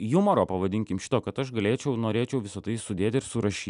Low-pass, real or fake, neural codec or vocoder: 10.8 kHz; real; none